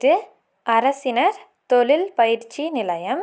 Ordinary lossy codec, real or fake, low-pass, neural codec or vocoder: none; real; none; none